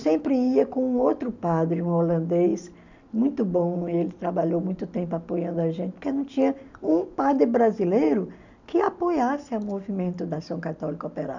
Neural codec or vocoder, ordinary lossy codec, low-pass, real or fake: none; none; 7.2 kHz; real